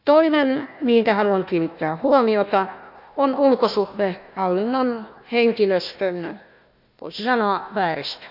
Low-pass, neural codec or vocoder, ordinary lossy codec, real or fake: 5.4 kHz; codec, 16 kHz, 1 kbps, FunCodec, trained on Chinese and English, 50 frames a second; none; fake